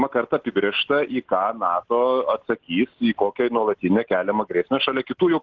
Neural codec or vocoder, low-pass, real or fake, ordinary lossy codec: none; 7.2 kHz; real; Opus, 16 kbps